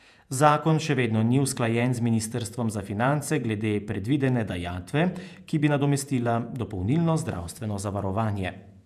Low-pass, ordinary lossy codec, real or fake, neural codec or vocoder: 14.4 kHz; none; fake; vocoder, 48 kHz, 128 mel bands, Vocos